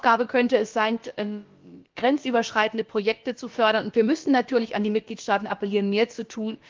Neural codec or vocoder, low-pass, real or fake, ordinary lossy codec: codec, 16 kHz, about 1 kbps, DyCAST, with the encoder's durations; 7.2 kHz; fake; Opus, 32 kbps